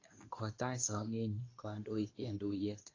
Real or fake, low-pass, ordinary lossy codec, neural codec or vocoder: fake; 7.2 kHz; AAC, 32 kbps; codec, 24 kHz, 0.9 kbps, WavTokenizer, medium speech release version 2